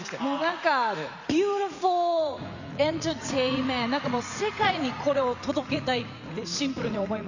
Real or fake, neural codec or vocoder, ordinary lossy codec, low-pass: fake; vocoder, 44.1 kHz, 80 mel bands, Vocos; none; 7.2 kHz